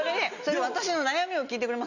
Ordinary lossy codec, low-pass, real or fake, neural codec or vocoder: none; 7.2 kHz; real; none